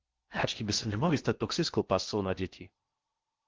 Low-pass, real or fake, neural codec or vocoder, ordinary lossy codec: 7.2 kHz; fake; codec, 16 kHz in and 24 kHz out, 0.6 kbps, FocalCodec, streaming, 4096 codes; Opus, 16 kbps